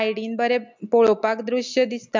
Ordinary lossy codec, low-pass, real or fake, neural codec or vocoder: MP3, 64 kbps; 7.2 kHz; real; none